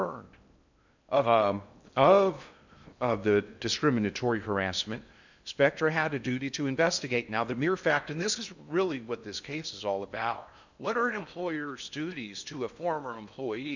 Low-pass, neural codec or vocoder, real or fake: 7.2 kHz; codec, 16 kHz in and 24 kHz out, 0.8 kbps, FocalCodec, streaming, 65536 codes; fake